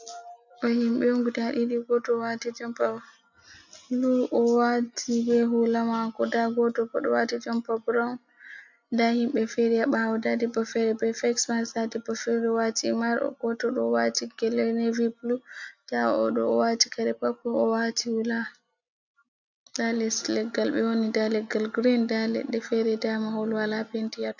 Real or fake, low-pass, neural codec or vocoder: real; 7.2 kHz; none